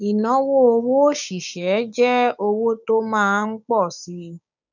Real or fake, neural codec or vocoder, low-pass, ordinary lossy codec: fake; codec, 16 kHz, 6 kbps, DAC; 7.2 kHz; none